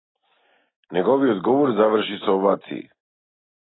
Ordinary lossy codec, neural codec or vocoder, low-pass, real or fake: AAC, 16 kbps; none; 7.2 kHz; real